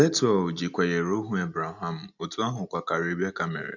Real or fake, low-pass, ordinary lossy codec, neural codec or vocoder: real; 7.2 kHz; none; none